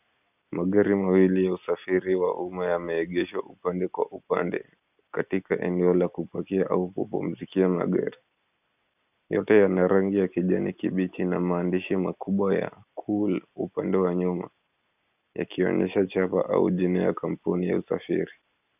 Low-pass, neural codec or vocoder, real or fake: 3.6 kHz; none; real